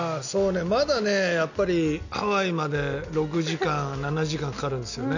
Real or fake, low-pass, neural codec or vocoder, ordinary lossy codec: real; 7.2 kHz; none; none